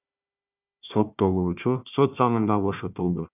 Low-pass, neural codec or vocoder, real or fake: 3.6 kHz; codec, 16 kHz, 1 kbps, FunCodec, trained on Chinese and English, 50 frames a second; fake